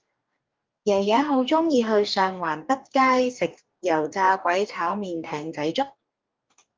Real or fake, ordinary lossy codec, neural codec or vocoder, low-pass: fake; Opus, 24 kbps; codec, 44.1 kHz, 2.6 kbps, DAC; 7.2 kHz